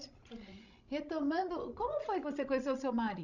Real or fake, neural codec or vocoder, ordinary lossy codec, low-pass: fake; codec, 16 kHz, 16 kbps, FreqCodec, larger model; none; 7.2 kHz